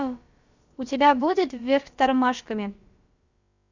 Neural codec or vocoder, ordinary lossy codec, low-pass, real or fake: codec, 16 kHz, about 1 kbps, DyCAST, with the encoder's durations; Opus, 64 kbps; 7.2 kHz; fake